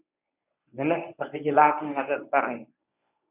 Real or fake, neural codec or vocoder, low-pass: fake; codec, 24 kHz, 0.9 kbps, WavTokenizer, medium speech release version 1; 3.6 kHz